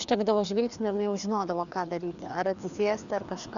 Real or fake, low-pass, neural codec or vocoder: fake; 7.2 kHz; codec, 16 kHz, 2 kbps, FreqCodec, larger model